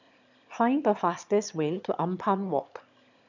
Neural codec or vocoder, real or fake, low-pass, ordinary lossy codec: autoencoder, 22.05 kHz, a latent of 192 numbers a frame, VITS, trained on one speaker; fake; 7.2 kHz; none